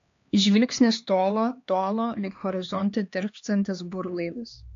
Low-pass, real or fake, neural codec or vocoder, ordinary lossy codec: 7.2 kHz; fake; codec, 16 kHz, 2 kbps, X-Codec, HuBERT features, trained on LibriSpeech; AAC, 48 kbps